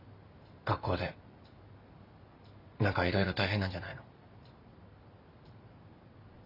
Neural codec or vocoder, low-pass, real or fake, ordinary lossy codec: none; 5.4 kHz; real; none